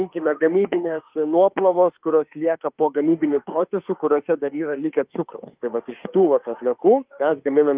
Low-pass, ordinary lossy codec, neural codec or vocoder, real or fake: 3.6 kHz; Opus, 32 kbps; autoencoder, 48 kHz, 32 numbers a frame, DAC-VAE, trained on Japanese speech; fake